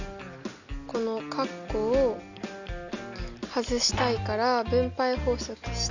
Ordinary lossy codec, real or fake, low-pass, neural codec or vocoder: none; real; 7.2 kHz; none